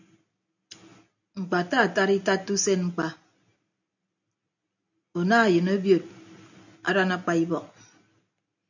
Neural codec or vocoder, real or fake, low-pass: none; real; 7.2 kHz